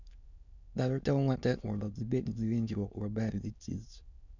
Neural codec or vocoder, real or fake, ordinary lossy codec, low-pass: autoencoder, 22.05 kHz, a latent of 192 numbers a frame, VITS, trained on many speakers; fake; none; 7.2 kHz